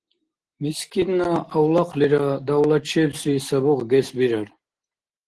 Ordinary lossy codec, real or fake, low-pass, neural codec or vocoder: Opus, 16 kbps; real; 10.8 kHz; none